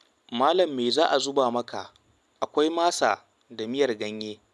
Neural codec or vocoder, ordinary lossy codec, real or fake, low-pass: none; none; real; none